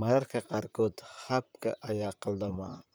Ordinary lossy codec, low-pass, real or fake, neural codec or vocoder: none; none; fake; vocoder, 44.1 kHz, 128 mel bands, Pupu-Vocoder